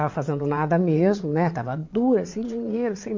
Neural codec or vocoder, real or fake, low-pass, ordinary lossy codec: vocoder, 22.05 kHz, 80 mel bands, WaveNeXt; fake; 7.2 kHz; MP3, 48 kbps